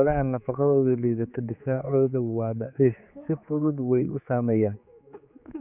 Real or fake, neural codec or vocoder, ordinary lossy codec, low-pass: fake; codec, 16 kHz, 4 kbps, X-Codec, HuBERT features, trained on general audio; none; 3.6 kHz